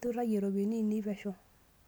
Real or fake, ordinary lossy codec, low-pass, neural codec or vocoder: real; none; none; none